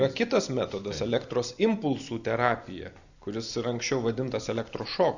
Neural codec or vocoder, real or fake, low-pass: none; real; 7.2 kHz